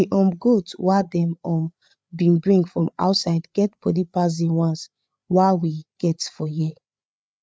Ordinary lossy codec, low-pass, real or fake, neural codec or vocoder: none; none; fake; codec, 16 kHz, 8 kbps, FreqCodec, larger model